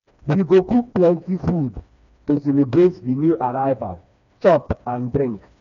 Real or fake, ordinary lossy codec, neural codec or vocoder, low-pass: fake; none; codec, 16 kHz, 2 kbps, FreqCodec, smaller model; 7.2 kHz